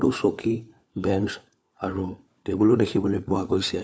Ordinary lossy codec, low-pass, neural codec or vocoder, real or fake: none; none; codec, 16 kHz, 4 kbps, FunCodec, trained on LibriTTS, 50 frames a second; fake